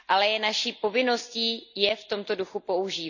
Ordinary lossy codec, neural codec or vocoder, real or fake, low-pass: none; none; real; 7.2 kHz